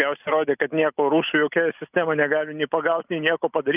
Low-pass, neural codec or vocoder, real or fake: 3.6 kHz; none; real